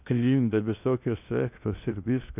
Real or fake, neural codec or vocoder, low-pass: fake; codec, 16 kHz in and 24 kHz out, 0.6 kbps, FocalCodec, streaming, 2048 codes; 3.6 kHz